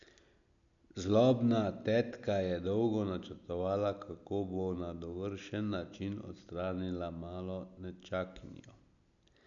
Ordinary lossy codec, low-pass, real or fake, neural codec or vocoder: none; 7.2 kHz; real; none